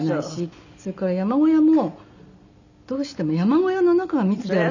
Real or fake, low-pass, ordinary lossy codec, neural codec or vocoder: real; 7.2 kHz; none; none